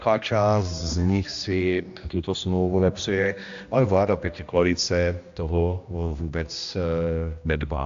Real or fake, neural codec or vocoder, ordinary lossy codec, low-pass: fake; codec, 16 kHz, 1 kbps, X-Codec, HuBERT features, trained on general audio; AAC, 64 kbps; 7.2 kHz